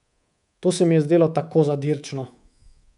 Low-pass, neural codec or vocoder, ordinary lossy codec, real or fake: 10.8 kHz; codec, 24 kHz, 3.1 kbps, DualCodec; none; fake